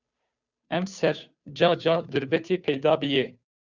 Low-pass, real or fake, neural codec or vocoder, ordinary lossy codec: 7.2 kHz; fake; codec, 16 kHz, 2 kbps, FunCodec, trained on Chinese and English, 25 frames a second; Opus, 64 kbps